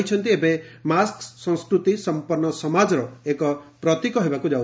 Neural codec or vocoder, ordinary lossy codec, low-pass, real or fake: none; none; none; real